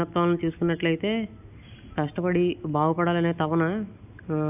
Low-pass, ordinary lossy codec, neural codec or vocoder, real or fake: 3.6 kHz; none; none; real